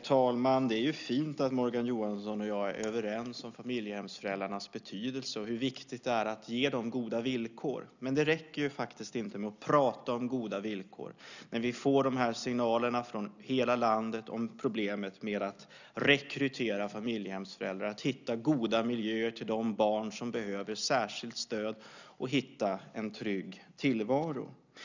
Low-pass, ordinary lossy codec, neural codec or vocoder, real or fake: 7.2 kHz; none; none; real